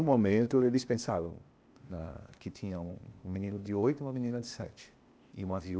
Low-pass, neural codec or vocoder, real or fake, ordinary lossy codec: none; codec, 16 kHz, 0.8 kbps, ZipCodec; fake; none